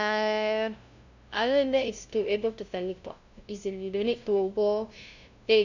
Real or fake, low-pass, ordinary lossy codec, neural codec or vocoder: fake; 7.2 kHz; none; codec, 16 kHz, 0.5 kbps, FunCodec, trained on LibriTTS, 25 frames a second